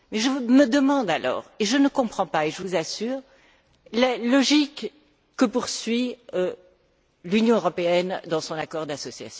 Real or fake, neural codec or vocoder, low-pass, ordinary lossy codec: real; none; none; none